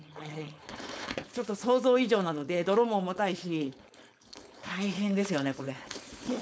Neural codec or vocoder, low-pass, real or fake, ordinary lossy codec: codec, 16 kHz, 4.8 kbps, FACodec; none; fake; none